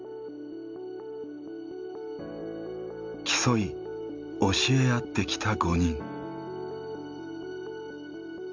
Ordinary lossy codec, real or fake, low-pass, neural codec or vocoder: MP3, 64 kbps; real; 7.2 kHz; none